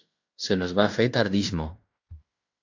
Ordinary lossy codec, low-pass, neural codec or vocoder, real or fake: AAC, 48 kbps; 7.2 kHz; codec, 16 kHz in and 24 kHz out, 0.9 kbps, LongCat-Audio-Codec, fine tuned four codebook decoder; fake